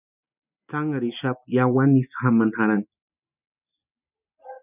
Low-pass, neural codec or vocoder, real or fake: 3.6 kHz; none; real